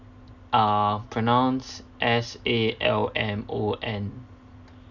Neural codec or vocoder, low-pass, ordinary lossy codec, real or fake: none; 7.2 kHz; none; real